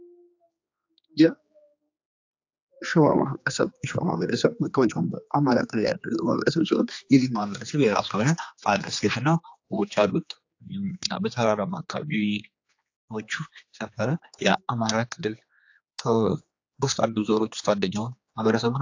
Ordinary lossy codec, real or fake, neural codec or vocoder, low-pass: AAC, 48 kbps; fake; codec, 16 kHz, 2 kbps, X-Codec, HuBERT features, trained on general audio; 7.2 kHz